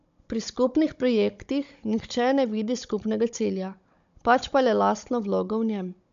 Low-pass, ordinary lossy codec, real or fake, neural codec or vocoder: 7.2 kHz; MP3, 64 kbps; fake; codec, 16 kHz, 16 kbps, FunCodec, trained on Chinese and English, 50 frames a second